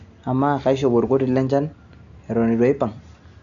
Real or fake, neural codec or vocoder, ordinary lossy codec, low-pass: real; none; none; 7.2 kHz